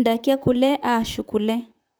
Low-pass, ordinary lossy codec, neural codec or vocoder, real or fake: none; none; vocoder, 44.1 kHz, 128 mel bands, Pupu-Vocoder; fake